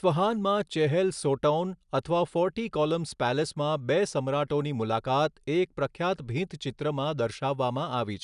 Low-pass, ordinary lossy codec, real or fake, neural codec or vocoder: 10.8 kHz; none; real; none